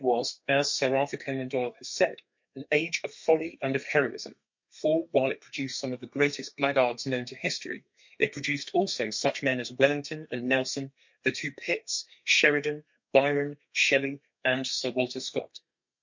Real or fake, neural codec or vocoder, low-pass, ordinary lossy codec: fake; codec, 32 kHz, 1.9 kbps, SNAC; 7.2 kHz; MP3, 48 kbps